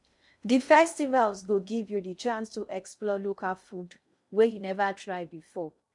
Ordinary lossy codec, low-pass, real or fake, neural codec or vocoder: none; 10.8 kHz; fake; codec, 16 kHz in and 24 kHz out, 0.6 kbps, FocalCodec, streaming, 2048 codes